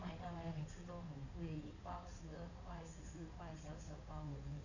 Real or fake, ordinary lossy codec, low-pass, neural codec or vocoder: fake; none; 7.2 kHz; codec, 16 kHz in and 24 kHz out, 2.2 kbps, FireRedTTS-2 codec